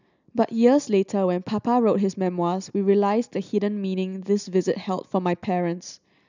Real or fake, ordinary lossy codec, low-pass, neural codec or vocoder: real; none; 7.2 kHz; none